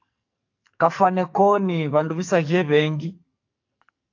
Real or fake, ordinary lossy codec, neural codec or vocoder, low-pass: fake; AAC, 48 kbps; codec, 44.1 kHz, 2.6 kbps, SNAC; 7.2 kHz